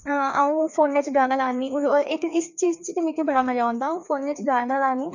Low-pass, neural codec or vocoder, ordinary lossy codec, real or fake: 7.2 kHz; codec, 16 kHz, 2 kbps, FreqCodec, larger model; none; fake